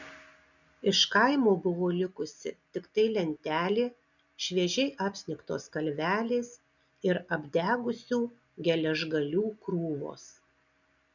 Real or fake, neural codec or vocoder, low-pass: real; none; 7.2 kHz